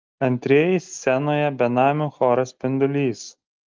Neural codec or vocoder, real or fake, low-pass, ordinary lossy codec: none; real; 7.2 kHz; Opus, 24 kbps